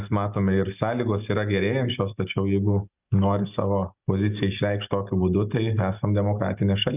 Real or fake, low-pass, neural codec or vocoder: real; 3.6 kHz; none